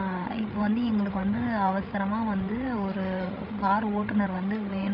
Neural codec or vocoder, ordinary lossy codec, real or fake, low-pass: codec, 16 kHz, 16 kbps, FreqCodec, larger model; AAC, 48 kbps; fake; 5.4 kHz